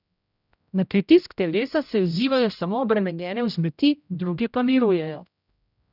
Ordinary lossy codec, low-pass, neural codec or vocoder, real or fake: none; 5.4 kHz; codec, 16 kHz, 0.5 kbps, X-Codec, HuBERT features, trained on general audio; fake